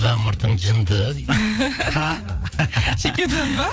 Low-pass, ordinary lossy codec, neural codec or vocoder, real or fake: none; none; codec, 16 kHz, 4 kbps, FreqCodec, larger model; fake